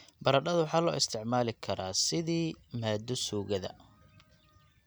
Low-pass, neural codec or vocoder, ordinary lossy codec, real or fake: none; none; none; real